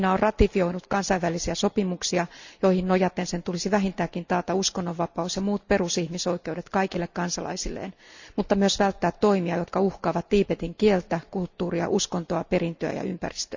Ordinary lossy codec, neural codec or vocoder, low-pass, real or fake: Opus, 64 kbps; none; 7.2 kHz; real